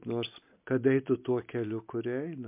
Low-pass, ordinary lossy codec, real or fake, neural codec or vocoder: 3.6 kHz; MP3, 32 kbps; real; none